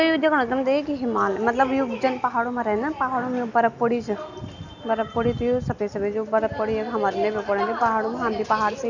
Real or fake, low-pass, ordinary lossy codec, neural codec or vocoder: real; 7.2 kHz; none; none